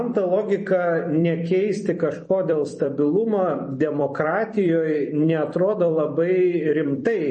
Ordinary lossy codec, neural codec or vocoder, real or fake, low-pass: MP3, 32 kbps; vocoder, 44.1 kHz, 128 mel bands every 256 samples, BigVGAN v2; fake; 10.8 kHz